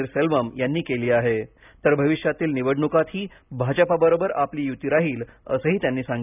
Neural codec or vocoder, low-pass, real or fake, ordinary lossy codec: none; 3.6 kHz; real; none